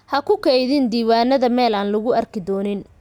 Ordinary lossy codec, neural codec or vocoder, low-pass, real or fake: none; none; 19.8 kHz; real